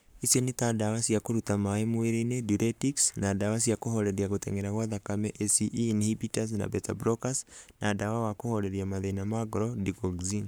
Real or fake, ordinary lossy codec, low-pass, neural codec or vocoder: fake; none; none; codec, 44.1 kHz, 7.8 kbps, Pupu-Codec